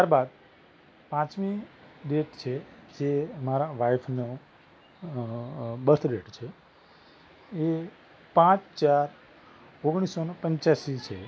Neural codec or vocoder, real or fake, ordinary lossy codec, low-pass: none; real; none; none